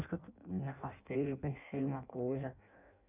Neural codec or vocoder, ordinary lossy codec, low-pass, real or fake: codec, 16 kHz in and 24 kHz out, 0.6 kbps, FireRedTTS-2 codec; none; 3.6 kHz; fake